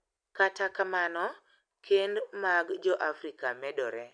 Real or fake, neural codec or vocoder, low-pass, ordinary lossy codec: real; none; 9.9 kHz; none